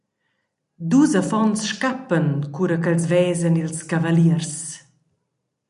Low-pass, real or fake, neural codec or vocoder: 10.8 kHz; real; none